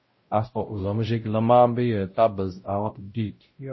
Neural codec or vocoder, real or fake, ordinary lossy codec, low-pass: codec, 16 kHz, 0.5 kbps, X-Codec, WavLM features, trained on Multilingual LibriSpeech; fake; MP3, 24 kbps; 7.2 kHz